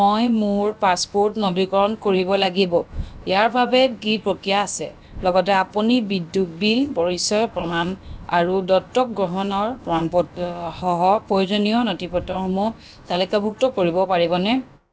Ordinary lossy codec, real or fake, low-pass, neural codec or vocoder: none; fake; none; codec, 16 kHz, about 1 kbps, DyCAST, with the encoder's durations